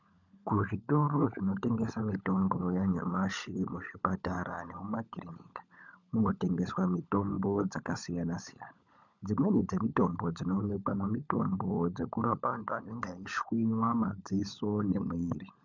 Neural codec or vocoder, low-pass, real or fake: codec, 16 kHz, 16 kbps, FunCodec, trained on LibriTTS, 50 frames a second; 7.2 kHz; fake